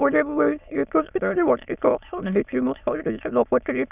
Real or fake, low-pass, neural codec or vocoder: fake; 3.6 kHz; autoencoder, 22.05 kHz, a latent of 192 numbers a frame, VITS, trained on many speakers